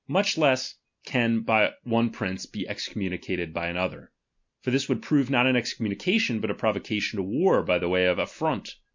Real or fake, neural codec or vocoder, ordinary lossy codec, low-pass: real; none; MP3, 64 kbps; 7.2 kHz